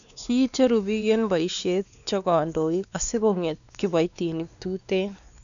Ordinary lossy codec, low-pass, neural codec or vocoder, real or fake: none; 7.2 kHz; codec, 16 kHz, 2 kbps, X-Codec, WavLM features, trained on Multilingual LibriSpeech; fake